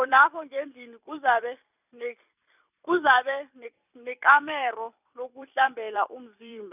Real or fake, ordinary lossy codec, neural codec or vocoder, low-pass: fake; none; vocoder, 44.1 kHz, 128 mel bands every 256 samples, BigVGAN v2; 3.6 kHz